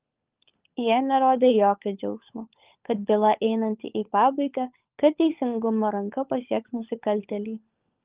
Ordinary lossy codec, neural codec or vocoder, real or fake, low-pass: Opus, 24 kbps; codec, 16 kHz, 16 kbps, FunCodec, trained on LibriTTS, 50 frames a second; fake; 3.6 kHz